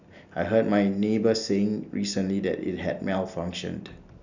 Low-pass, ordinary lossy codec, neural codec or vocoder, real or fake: 7.2 kHz; none; none; real